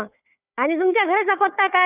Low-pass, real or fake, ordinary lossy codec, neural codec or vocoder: 3.6 kHz; fake; none; codec, 16 kHz, 4 kbps, FunCodec, trained on Chinese and English, 50 frames a second